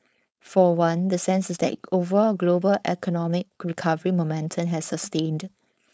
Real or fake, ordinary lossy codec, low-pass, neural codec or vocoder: fake; none; none; codec, 16 kHz, 4.8 kbps, FACodec